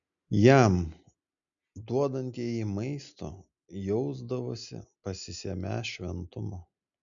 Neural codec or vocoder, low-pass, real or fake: none; 7.2 kHz; real